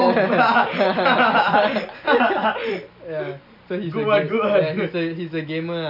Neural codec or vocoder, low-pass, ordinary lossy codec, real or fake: none; 5.4 kHz; none; real